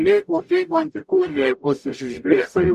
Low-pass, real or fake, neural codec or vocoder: 14.4 kHz; fake; codec, 44.1 kHz, 0.9 kbps, DAC